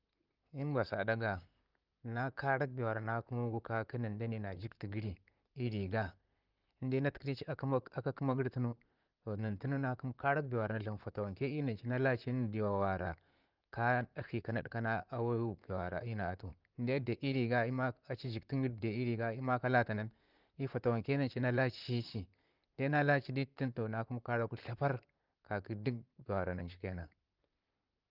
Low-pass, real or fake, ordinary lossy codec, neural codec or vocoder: 5.4 kHz; fake; Opus, 24 kbps; vocoder, 44.1 kHz, 128 mel bands, Pupu-Vocoder